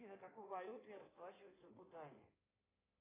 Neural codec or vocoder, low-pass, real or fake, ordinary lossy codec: codec, 16 kHz in and 24 kHz out, 2.2 kbps, FireRedTTS-2 codec; 3.6 kHz; fake; AAC, 16 kbps